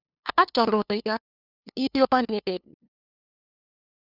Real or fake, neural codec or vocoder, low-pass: fake; codec, 16 kHz, 2 kbps, FunCodec, trained on LibriTTS, 25 frames a second; 5.4 kHz